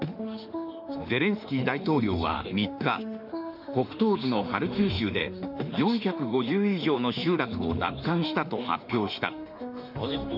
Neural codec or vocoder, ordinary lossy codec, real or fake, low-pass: autoencoder, 48 kHz, 32 numbers a frame, DAC-VAE, trained on Japanese speech; none; fake; 5.4 kHz